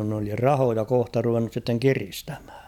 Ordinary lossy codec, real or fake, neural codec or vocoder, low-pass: none; real; none; 19.8 kHz